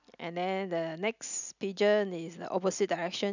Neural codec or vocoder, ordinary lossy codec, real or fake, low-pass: none; none; real; 7.2 kHz